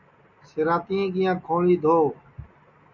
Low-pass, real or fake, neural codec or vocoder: 7.2 kHz; real; none